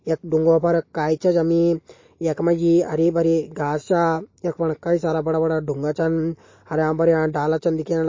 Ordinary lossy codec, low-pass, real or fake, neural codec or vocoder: MP3, 32 kbps; 7.2 kHz; real; none